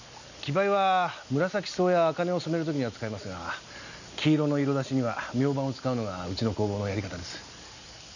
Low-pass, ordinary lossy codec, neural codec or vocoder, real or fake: 7.2 kHz; none; none; real